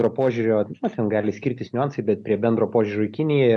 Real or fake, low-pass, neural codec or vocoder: real; 10.8 kHz; none